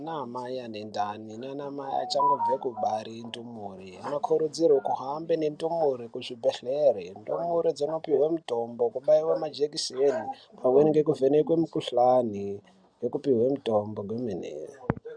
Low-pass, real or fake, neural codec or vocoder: 9.9 kHz; real; none